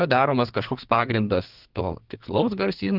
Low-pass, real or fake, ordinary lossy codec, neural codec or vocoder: 5.4 kHz; fake; Opus, 16 kbps; codec, 32 kHz, 1.9 kbps, SNAC